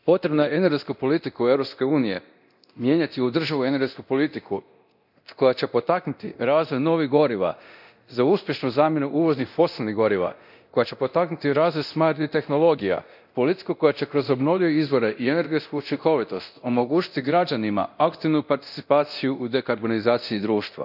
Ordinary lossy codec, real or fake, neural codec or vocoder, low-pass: none; fake; codec, 24 kHz, 0.9 kbps, DualCodec; 5.4 kHz